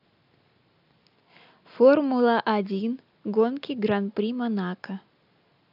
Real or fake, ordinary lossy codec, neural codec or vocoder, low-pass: real; none; none; 5.4 kHz